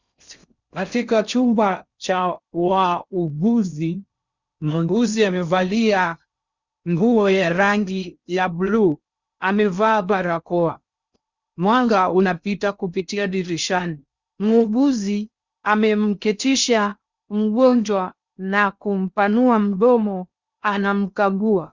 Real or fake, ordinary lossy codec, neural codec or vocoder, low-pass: fake; Opus, 64 kbps; codec, 16 kHz in and 24 kHz out, 0.6 kbps, FocalCodec, streaming, 2048 codes; 7.2 kHz